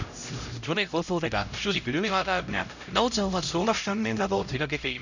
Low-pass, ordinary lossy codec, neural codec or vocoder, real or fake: 7.2 kHz; none; codec, 16 kHz, 0.5 kbps, X-Codec, HuBERT features, trained on LibriSpeech; fake